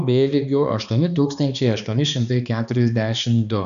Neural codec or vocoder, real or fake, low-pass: codec, 16 kHz, 2 kbps, X-Codec, HuBERT features, trained on balanced general audio; fake; 7.2 kHz